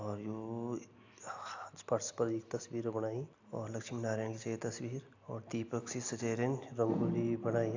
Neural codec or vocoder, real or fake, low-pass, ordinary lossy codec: none; real; 7.2 kHz; AAC, 48 kbps